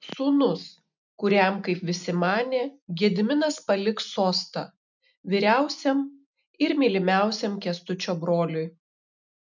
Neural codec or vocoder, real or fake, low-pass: none; real; 7.2 kHz